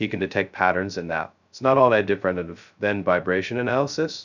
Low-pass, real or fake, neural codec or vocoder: 7.2 kHz; fake; codec, 16 kHz, 0.2 kbps, FocalCodec